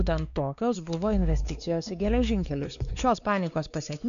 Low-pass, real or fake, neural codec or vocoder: 7.2 kHz; fake; codec, 16 kHz, 2 kbps, X-Codec, WavLM features, trained on Multilingual LibriSpeech